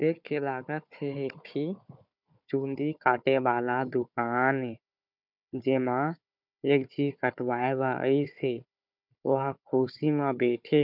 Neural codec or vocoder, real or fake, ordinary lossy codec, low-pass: codec, 16 kHz, 4 kbps, FunCodec, trained on Chinese and English, 50 frames a second; fake; none; 5.4 kHz